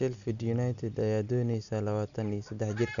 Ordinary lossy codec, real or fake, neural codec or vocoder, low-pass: none; real; none; 7.2 kHz